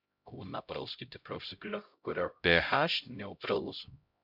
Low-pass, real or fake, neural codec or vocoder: 5.4 kHz; fake; codec, 16 kHz, 0.5 kbps, X-Codec, HuBERT features, trained on LibriSpeech